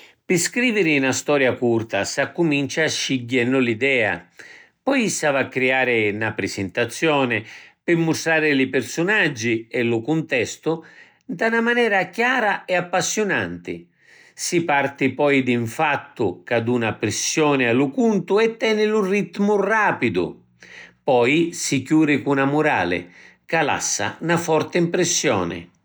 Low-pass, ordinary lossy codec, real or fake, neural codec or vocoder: none; none; real; none